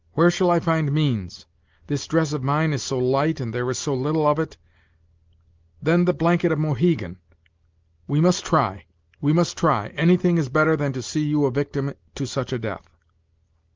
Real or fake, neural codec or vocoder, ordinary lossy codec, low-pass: real; none; Opus, 32 kbps; 7.2 kHz